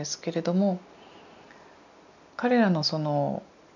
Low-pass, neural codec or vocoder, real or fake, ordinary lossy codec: 7.2 kHz; none; real; none